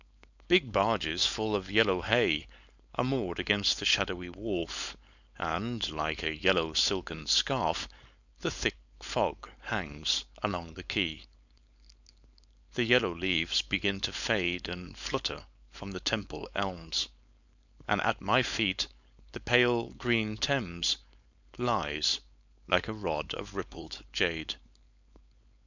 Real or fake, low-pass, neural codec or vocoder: fake; 7.2 kHz; codec, 16 kHz, 4.8 kbps, FACodec